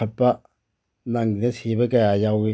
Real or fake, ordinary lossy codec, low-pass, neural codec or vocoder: real; none; none; none